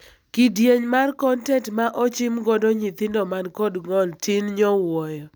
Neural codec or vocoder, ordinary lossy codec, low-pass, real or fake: none; none; none; real